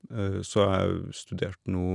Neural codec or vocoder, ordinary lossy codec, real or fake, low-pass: none; none; real; 10.8 kHz